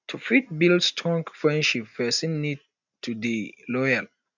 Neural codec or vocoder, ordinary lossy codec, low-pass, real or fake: none; none; 7.2 kHz; real